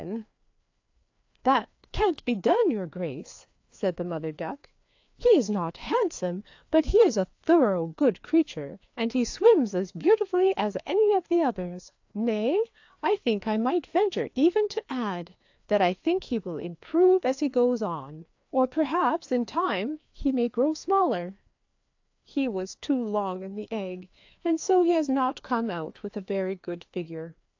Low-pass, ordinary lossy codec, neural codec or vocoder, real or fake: 7.2 kHz; AAC, 48 kbps; codec, 16 kHz, 2 kbps, FreqCodec, larger model; fake